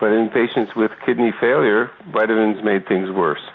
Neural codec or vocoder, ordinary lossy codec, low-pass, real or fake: none; Opus, 64 kbps; 7.2 kHz; real